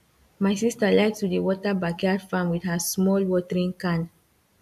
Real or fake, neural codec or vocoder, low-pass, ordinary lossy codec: real; none; 14.4 kHz; AAC, 96 kbps